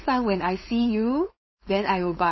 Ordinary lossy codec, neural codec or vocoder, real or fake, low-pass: MP3, 24 kbps; codec, 16 kHz, 4.8 kbps, FACodec; fake; 7.2 kHz